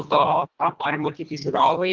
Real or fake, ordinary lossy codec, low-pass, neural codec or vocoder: fake; Opus, 24 kbps; 7.2 kHz; codec, 24 kHz, 1.5 kbps, HILCodec